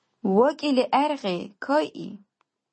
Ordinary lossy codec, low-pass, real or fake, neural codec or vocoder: MP3, 32 kbps; 9.9 kHz; real; none